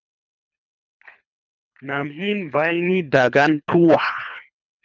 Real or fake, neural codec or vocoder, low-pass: fake; codec, 24 kHz, 3 kbps, HILCodec; 7.2 kHz